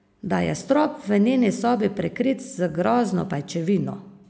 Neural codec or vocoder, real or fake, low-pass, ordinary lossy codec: none; real; none; none